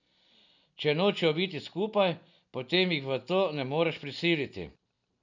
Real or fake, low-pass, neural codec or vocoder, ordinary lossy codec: real; 7.2 kHz; none; none